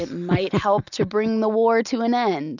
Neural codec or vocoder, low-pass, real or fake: none; 7.2 kHz; real